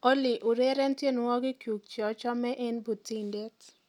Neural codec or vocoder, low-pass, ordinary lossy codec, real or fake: none; 19.8 kHz; none; real